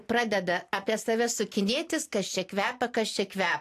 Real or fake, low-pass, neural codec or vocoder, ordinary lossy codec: real; 14.4 kHz; none; AAC, 64 kbps